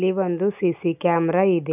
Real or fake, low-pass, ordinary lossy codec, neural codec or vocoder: real; 3.6 kHz; none; none